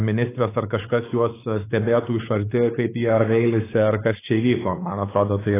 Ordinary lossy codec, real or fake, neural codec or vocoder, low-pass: AAC, 16 kbps; fake; codec, 16 kHz, 4 kbps, X-Codec, WavLM features, trained on Multilingual LibriSpeech; 3.6 kHz